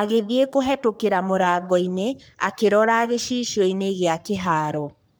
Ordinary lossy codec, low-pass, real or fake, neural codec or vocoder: none; none; fake; codec, 44.1 kHz, 3.4 kbps, Pupu-Codec